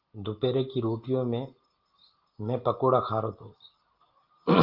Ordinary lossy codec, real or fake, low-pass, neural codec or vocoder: Opus, 24 kbps; real; 5.4 kHz; none